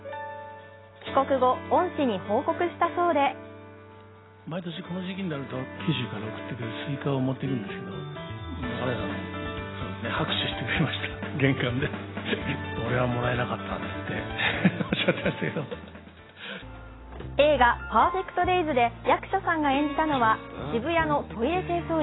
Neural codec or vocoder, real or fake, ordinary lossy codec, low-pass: none; real; AAC, 16 kbps; 7.2 kHz